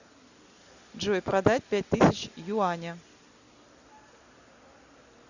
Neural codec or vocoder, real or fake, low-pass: none; real; 7.2 kHz